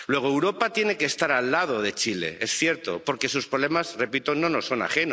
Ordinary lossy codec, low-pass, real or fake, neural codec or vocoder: none; none; real; none